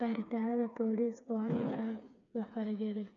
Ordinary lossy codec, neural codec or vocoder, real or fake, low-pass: none; codec, 16 kHz, 4 kbps, FreqCodec, smaller model; fake; 7.2 kHz